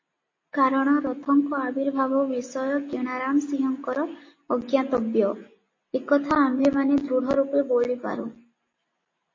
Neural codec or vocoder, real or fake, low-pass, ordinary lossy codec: none; real; 7.2 kHz; MP3, 32 kbps